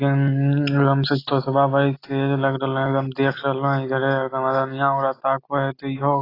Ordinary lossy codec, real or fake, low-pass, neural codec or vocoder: AAC, 24 kbps; real; 5.4 kHz; none